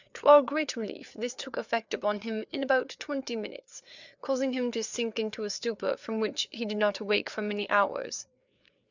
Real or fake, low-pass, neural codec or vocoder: fake; 7.2 kHz; codec, 16 kHz, 4 kbps, FreqCodec, larger model